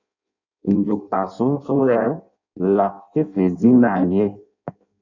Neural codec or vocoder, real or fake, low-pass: codec, 16 kHz in and 24 kHz out, 0.6 kbps, FireRedTTS-2 codec; fake; 7.2 kHz